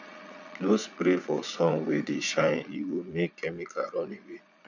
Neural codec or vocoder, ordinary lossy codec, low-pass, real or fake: vocoder, 22.05 kHz, 80 mel bands, Vocos; none; 7.2 kHz; fake